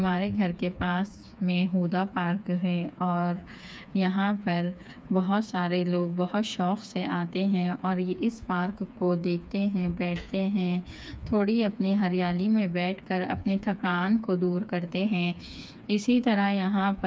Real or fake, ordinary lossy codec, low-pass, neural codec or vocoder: fake; none; none; codec, 16 kHz, 4 kbps, FreqCodec, smaller model